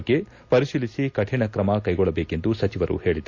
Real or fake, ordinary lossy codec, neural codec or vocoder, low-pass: real; AAC, 48 kbps; none; 7.2 kHz